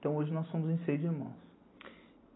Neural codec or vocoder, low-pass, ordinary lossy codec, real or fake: none; 7.2 kHz; AAC, 16 kbps; real